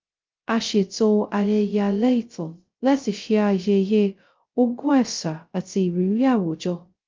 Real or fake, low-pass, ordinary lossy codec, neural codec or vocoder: fake; 7.2 kHz; Opus, 24 kbps; codec, 16 kHz, 0.2 kbps, FocalCodec